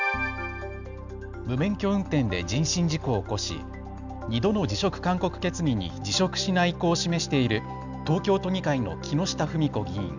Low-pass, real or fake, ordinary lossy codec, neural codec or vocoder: 7.2 kHz; real; none; none